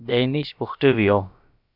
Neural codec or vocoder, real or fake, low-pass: codec, 16 kHz, about 1 kbps, DyCAST, with the encoder's durations; fake; 5.4 kHz